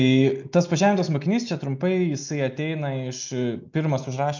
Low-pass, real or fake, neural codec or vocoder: 7.2 kHz; real; none